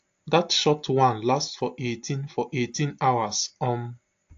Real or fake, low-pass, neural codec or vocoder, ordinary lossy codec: real; 7.2 kHz; none; AAC, 48 kbps